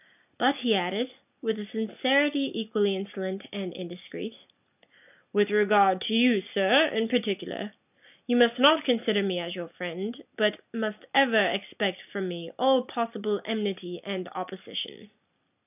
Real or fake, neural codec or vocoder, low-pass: real; none; 3.6 kHz